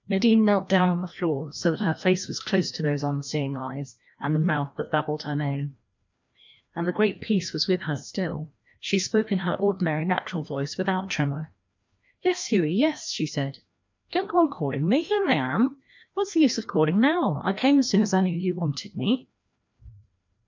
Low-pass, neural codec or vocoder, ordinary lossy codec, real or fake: 7.2 kHz; codec, 16 kHz, 1 kbps, FreqCodec, larger model; MP3, 64 kbps; fake